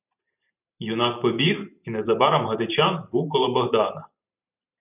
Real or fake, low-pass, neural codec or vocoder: fake; 3.6 kHz; vocoder, 44.1 kHz, 128 mel bands every 512 samples, BigVGAN v2